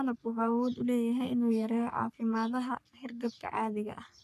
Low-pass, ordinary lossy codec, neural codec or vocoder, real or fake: 14.4 kHz; none; codec, 44.1 kHz, 3.4 kbps, Pupu-Codec; fake